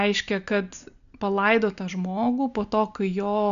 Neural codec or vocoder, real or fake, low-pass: none; real; 7.2 kHz